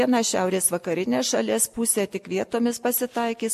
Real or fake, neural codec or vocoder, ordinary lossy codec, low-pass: real; none; MP3, 64 kbps; 14.4 kHz